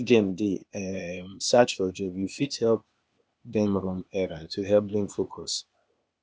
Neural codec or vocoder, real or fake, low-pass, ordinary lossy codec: codec, 16 kHz, 0.8 kbps, ZipCodec; fake; none; none